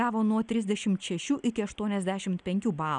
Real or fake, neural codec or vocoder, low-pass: real; none; 9.9 kHz